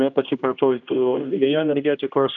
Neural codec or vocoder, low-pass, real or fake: codec, 16 kHz, 1 kbps, X-Codec, HuBERT features, trained on general audio; 7.2 kHz; fake